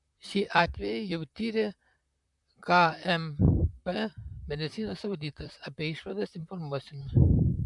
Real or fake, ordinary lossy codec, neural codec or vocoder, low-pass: fake; MP3, 96 kbps; vocoder, 44.1 kHz, 128 mel bands, Pupu-Vocoder; 10.8 kHz